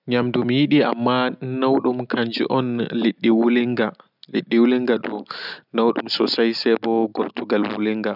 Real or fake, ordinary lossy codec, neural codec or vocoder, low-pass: real; none; none; 5.4 kHz